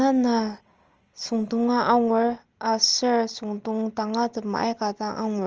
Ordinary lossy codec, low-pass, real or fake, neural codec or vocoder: Opus, 24 kbps; 7.2 kHz; real; none